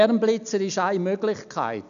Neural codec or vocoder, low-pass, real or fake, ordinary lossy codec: none; 7.2 kHz; real; none